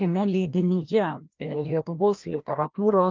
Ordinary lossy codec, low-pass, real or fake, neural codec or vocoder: Opus, 24 kbps; 7.2 kHz; fake; codec, 16 kHz, 1 kbps, FreqCodec, larger model